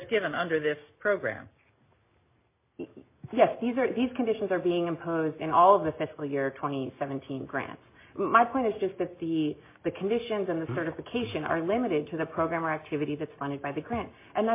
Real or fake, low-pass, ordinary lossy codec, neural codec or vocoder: real; 3.6 kHz; MP3, 24 kbps; none